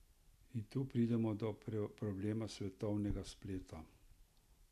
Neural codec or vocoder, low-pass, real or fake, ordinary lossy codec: none; 14.4 kHz; real; none